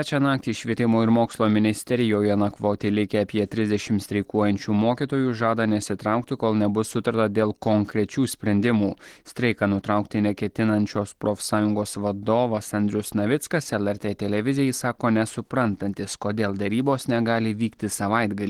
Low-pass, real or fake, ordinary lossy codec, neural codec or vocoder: 19.8 kHz; real; Opus, 16 kbps; none